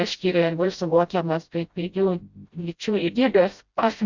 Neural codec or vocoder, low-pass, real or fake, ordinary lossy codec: codec, 16 kHz, 0.5 kbps, FreqCodec, smaller model; 7.2 kHz; fake; Opus, 64 kbps